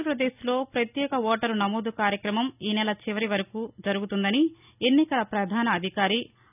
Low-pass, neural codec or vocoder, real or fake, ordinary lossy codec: 3.6 kHz; none; real; none